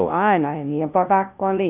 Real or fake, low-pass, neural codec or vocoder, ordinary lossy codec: fake; 3.6 kHz; codec, 16 kHz, 0.5 kbps, FunCodec, trained on LibriTTS, 25 frames a second; none